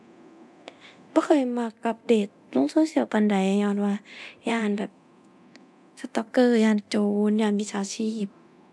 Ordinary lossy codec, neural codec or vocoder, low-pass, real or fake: none; codec, 24 kHz, 0.9 kbps, DualCodec; none; fake